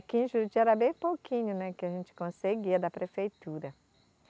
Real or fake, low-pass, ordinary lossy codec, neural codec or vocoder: real; none; none; none